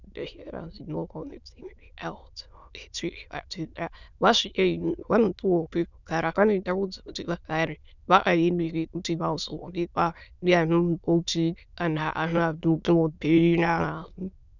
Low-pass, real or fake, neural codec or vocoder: 7.2 kHz; fake; autoencoder, 22.05 kHz, a latent of 192 numbers a frame, VITS, trained on many speakers